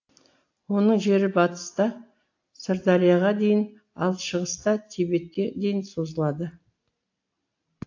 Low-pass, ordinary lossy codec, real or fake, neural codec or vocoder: 7.2 kHz; AAC, 48 kbps; real; none